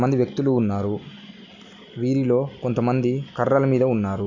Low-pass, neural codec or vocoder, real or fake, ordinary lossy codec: 7.2 kHz; none; real; none